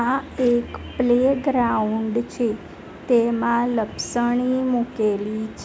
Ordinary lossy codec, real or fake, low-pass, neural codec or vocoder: none; real; none; none